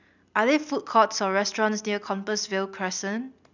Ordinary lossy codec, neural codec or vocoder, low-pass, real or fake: none; none; 7.2 kHz; real